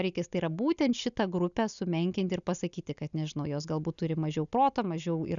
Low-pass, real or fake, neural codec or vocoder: 7.2 kHz; real; none